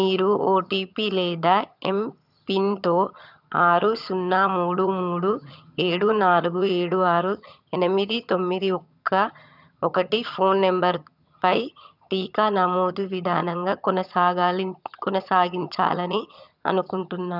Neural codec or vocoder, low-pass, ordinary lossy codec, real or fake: vocoder, 22.05 kHz, 80 mel bands, HiFi-GAN; 5.4 kHz; none; fake